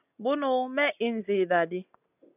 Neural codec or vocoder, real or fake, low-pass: none; real; 3.6 kHz